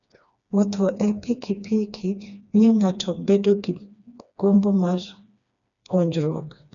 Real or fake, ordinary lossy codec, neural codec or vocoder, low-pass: fake; none; codec, 16 kHz, 2 kbps, FreqCodec, smaller model; 7.2 kHz